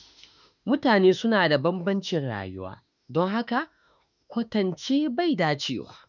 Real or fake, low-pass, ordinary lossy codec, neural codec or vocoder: fake; 7.2 kHz; none; autoencoder, 48 kHz, 32 numbers a frame, DAC-VAE, trained on Japanese speech